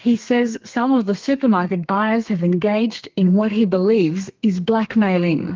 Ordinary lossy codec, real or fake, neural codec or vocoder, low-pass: Opus, 24 kbps; fake; codec, 32 kHz, 1.9 kbps, SNAC; 7.2 kHz